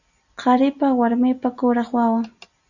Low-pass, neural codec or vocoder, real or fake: 7.2 kHz; none; real